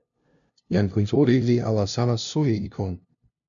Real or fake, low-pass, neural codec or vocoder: fake; 7.2 kHz; codec, 16 kHz, 0.5 kbps, FunCodec, trained on LibriTTS, 25 frames a second